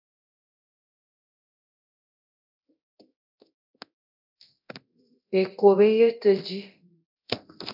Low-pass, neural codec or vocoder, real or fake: 5.4 kHz; codec, 24 kHz, 0.9 kbps, DualCodec; fake